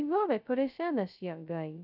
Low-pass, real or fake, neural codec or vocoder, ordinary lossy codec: 5.4 kHz; fake; codec, 16 kHz, 0.2 kbps, FocalCodec; none